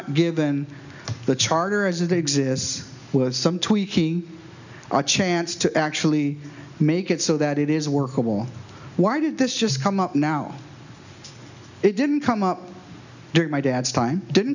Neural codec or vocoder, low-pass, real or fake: none; 7.2 kHz; real